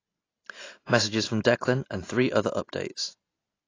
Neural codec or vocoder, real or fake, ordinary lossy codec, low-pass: none; real; AAC, 32 kbps; 7.2 kHz